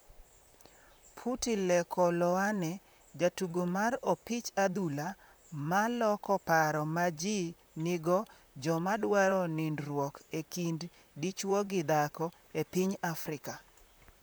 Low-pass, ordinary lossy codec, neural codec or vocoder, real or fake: none; none; vocoder, 44.1 kHz, 128 mel bands, Pupu-Vocoder; fake